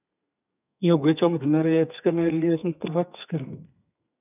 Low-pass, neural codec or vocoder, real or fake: 3.6 kHz; codec, 32 kHz, 1.9 kbps, SNAC; fake